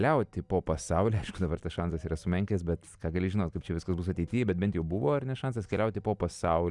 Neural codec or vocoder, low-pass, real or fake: none; 10.8 kHz; real